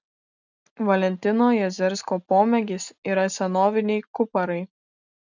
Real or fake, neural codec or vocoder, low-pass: real; none; 7.2 kHz